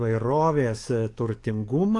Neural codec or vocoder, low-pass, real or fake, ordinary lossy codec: codec, 44.1 kHz, 7.8 kbps, DAC; 10.8 kHz; fake; AAC, 48 kbps